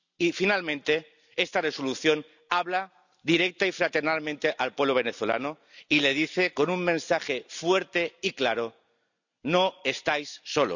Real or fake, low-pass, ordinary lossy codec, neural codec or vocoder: real; 7.2 kHz; none; none